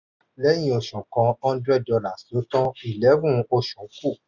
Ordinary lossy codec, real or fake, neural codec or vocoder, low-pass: none; real; none; 7.2 kHz